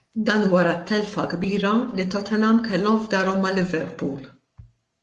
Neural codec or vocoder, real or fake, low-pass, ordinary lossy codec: codec, 44.1 kHz, 7.8 kbps, Pupu-Codec; fake; 10.8 kHz; Opus, 32 kbps